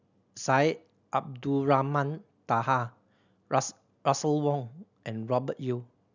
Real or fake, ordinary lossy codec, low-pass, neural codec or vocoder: real; none; 7.2 kHz; none